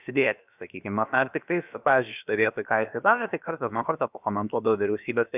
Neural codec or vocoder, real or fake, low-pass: codec, 16 kHz, about 1 kbps, DyCAST, with the encoder's durations; fake; 3.6 kHz